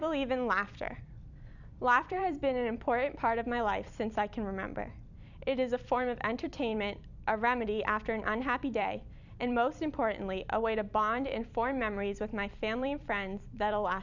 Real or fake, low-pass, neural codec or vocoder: fake; 7.2 kHz; vocoder, 44.1 kHz, 128 mel bands every 256 samples, BigVGAN v2